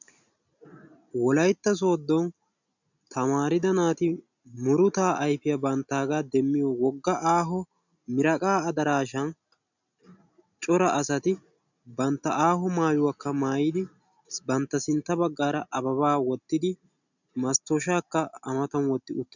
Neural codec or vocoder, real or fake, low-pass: none; real; 7.2 kHz